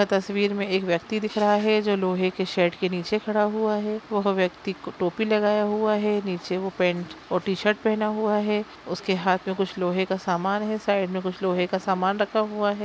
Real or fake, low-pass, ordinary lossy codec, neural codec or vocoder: real; none; none; none